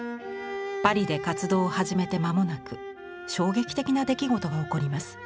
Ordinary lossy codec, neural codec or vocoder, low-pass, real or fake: none; none; none; real